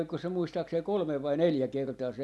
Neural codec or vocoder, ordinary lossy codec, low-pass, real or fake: none; none; none; real